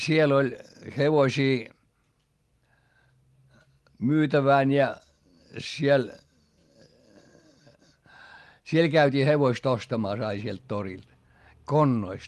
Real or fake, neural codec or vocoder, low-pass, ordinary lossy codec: real; none; 14.4 kHz; Opus, 24 kbps